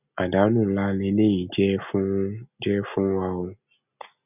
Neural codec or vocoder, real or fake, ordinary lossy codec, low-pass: none; real; none; 3.6 kHz